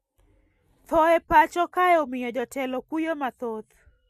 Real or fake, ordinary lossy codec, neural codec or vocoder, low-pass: real; none; none; 14.4 kHz